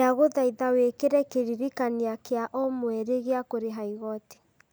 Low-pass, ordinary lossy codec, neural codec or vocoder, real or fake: none; none; none; real